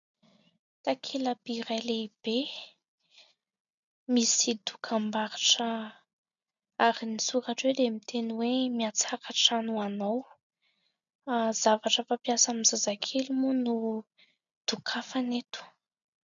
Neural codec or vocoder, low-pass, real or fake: none; 7.2 kHz; real